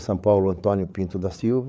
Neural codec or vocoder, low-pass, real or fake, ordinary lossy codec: codec, 16 kHz, 8 kbps, FreqCodec, larger model; none; fake; none